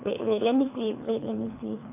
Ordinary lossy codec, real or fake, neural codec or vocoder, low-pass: AAC, 24 kbps; fake; codec, 24 kHz, 3 kbps, HILCodec; 3.6 kHz